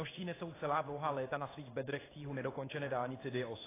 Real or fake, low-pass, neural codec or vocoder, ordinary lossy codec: fake; 3.6 kHz; codec, 16 kHz in and 24 kHz out, 1 kbps, XY-Tokenizer; AAC, 16 kbps